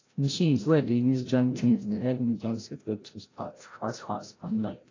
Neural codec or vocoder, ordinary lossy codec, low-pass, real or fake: codec, 16 kHz, 0.5 kbps, FreqCodec, larger model; AAC, 32 kbps; 7.2 kHz; fake